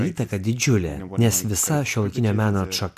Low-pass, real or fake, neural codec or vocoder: 14.4 kHz; real; none